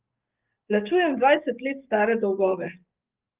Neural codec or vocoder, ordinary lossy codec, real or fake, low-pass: codec, 44.1 kHz, 7.8 kbps, DAC; Opus, 32 kbps; fake; 3.6 kHz